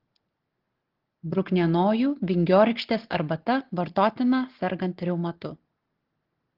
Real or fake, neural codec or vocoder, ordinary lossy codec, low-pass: real; none; Opus, 16 kbps; 5.4 kHz